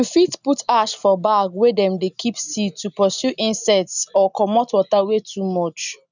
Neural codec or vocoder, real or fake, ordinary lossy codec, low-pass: none; real; none; 7.2 kHz